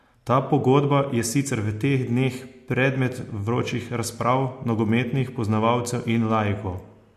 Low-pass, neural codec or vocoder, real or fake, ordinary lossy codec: 14.4 kHz; none; real; MP3, 64 kbps